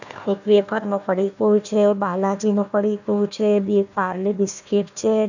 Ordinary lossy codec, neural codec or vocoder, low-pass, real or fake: none; codec, 16 kHz, 1 kbps, FunCodec, trained on Chinese and English, 50 frames a second; 7.2 kHz; fake